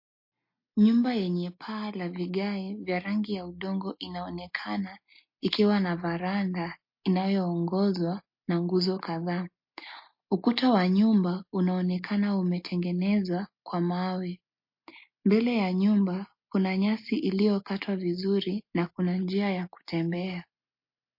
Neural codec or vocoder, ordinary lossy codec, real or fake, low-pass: none; MP3, 32 kbps; real; 5.4 kHz